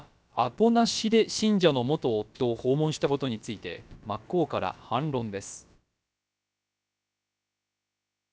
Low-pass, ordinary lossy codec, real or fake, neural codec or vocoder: none; none; fake; codec, 16 kHz, about 1 kbps, DyCAST, with the encoder's durations